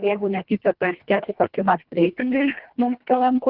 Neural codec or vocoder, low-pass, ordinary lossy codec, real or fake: codec, 24 kHz, 1.5 kbps, HILCodec; 5.4 kHz; Opus, 32 kbps; fake